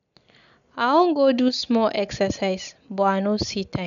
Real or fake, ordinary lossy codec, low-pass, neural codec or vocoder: real; none; 7.2 kHz; none